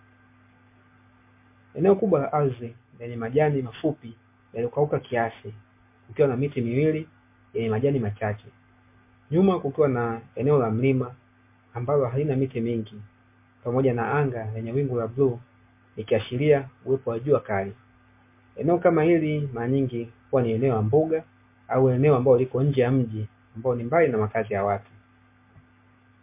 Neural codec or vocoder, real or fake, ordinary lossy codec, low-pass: none; real; MP3, 24 kbps; 3.6 kHz